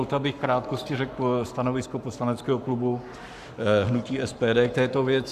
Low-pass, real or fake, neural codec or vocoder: 14.4 kHz; fake; codec, 44.1 kHz, 7.8 kbps, Pupu-Codec